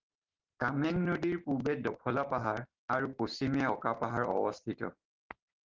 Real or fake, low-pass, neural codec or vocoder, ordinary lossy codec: real; 7.2 kHz; none; Opus, 16 kbps